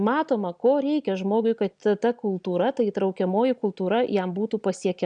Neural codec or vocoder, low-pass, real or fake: none; 9.9 kHz; real